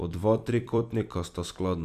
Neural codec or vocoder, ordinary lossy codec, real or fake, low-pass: vocoder, 44.1 kHz, 128 mel bands every 256 samples, BigVGAN v2; none; fake; 14.4 kHz